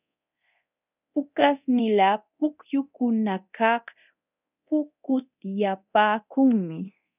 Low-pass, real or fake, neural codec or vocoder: 3.6 kHz; fake; codec, 24 kHz, 0.9 kbps, DualCodec